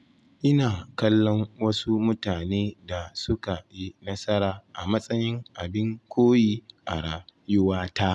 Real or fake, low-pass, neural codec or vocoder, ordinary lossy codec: real; none; none; none